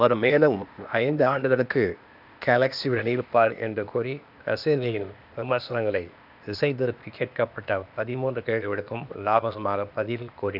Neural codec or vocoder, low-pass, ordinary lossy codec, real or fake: codec, 16 kHz, 0.8 kbps, ZipCodec; 5.4 kHz; none; fake